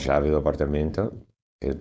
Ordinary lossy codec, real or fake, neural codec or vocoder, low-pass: none; fake; codec, 16 kHz, 4.8 kbps, FACodec; none